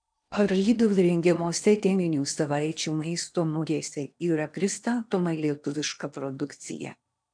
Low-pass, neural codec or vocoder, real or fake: 9.9 kHz; codec, 16 kHz in and 24 kHz out, 0.8 kbps, FocalCodec, streaming, 65536 codes; fake